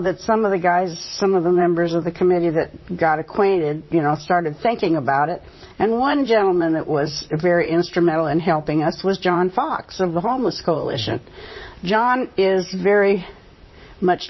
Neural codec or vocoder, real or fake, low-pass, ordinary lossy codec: vocoder, 44.1 kHz, 128 mel bands, Pupu-Vocoder; fake; 7.2 kHz; MP3, 24 kbps